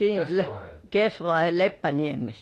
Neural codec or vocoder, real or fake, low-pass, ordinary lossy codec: autoencoder, 48 kHz, 32 numbers a frame, DAC-VAE, trained on Japanese speech; fake; 14.4 kHz; AAC, 48 kbps